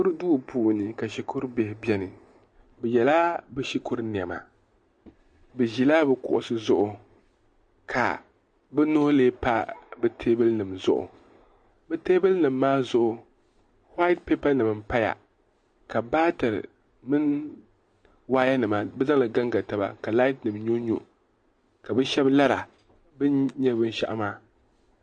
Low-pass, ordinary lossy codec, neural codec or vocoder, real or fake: 9.9 kHz; MP3, 48 kbps; none; real